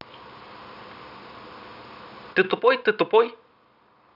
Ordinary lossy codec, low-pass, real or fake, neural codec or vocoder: none; 5.4 kHz; real; none